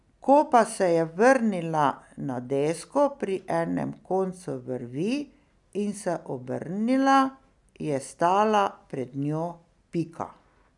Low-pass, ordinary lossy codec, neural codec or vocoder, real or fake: 10.8 kHz; none; none; real